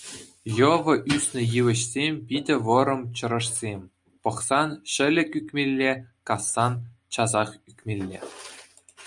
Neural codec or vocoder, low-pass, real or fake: none; 10.8 kHz; real